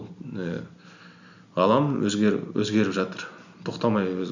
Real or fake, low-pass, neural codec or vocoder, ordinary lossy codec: real; 7.2 kHz; none; none